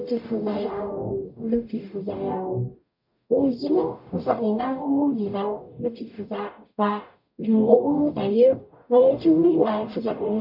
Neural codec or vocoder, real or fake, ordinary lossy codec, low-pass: codec, 44.1 kHz, 0.9 kbps, DAC; fake; none; 5.4 kHz